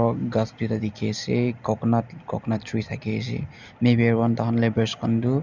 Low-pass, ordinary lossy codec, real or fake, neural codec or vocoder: 7.2 kHz; Opus, 64 kbps; real; none